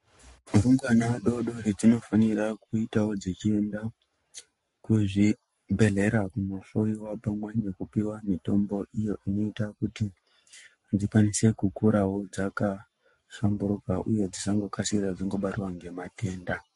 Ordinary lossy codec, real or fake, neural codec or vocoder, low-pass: MP3, 48 kbps; fake; codec, 44.1 kHz, 7.8 kbps, Pupu-Codec; 14.4 kHz